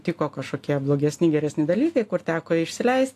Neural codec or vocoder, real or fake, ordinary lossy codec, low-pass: none; real; AAC, 64 kbps; 14.4 kHz